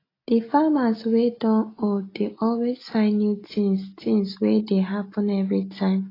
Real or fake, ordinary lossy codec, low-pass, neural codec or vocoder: real; AAC, 24 kbps; 5.4 kHz; none